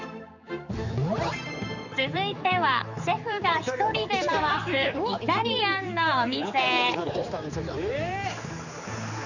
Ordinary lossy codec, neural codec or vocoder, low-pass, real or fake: none; codec, 16 kHz, 4 kbps, X-Codec, HuBERT features, trained on general audio; 7.2 kHz; fake